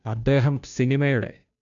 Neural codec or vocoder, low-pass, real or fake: codec, 16 kHz, 0.5 kbps, FunCodec, trained on Chinese and English, 25 frames a second; 7.2 kHz; fake